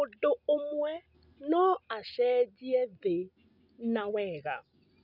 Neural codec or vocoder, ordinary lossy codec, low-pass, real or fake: none; none; 5.4 kHz; real